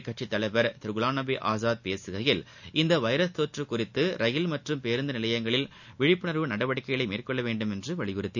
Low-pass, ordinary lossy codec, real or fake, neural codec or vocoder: 7.2 kHz; none; real; none